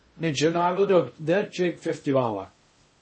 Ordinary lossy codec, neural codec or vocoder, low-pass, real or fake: MP3, 32 kbps; codec, 16 kHz in and 24 kHz out, 0.6 kbps, FocalCodec, streaming, 2048 codes; 9.9 kHz; fake